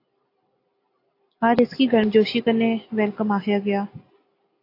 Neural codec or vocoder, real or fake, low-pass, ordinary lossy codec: none; real; 5.4 kHz; AAC, 32 kbps